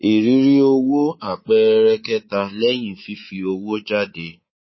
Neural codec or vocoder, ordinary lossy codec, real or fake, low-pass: codec, 24 kHz, 3.1 kbps, DualCodec; MP3, 24 kbps; fake; 7.2 kHz